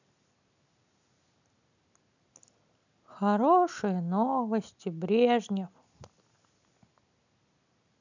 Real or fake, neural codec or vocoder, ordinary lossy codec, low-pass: real; none; none; 7.2 kHz